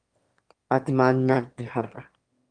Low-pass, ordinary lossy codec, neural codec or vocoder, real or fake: 9.9 kHz; Opus, 32 kbps; autoencoder, 22.05 kHz, a latent of 192 numbers a frame, VITS, trained on one speaker; fake